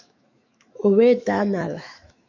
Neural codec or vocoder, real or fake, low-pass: autoencoder, 48 kHz, 128 numbers a frame, DAC-VAE, trained on Japanese speech; fake; 7.2 kHz